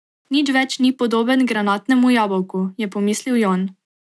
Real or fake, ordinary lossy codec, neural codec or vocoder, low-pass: real; none; none; none